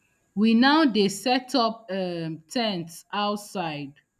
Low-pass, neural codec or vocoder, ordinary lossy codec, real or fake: 14.4 kHz; none; none; real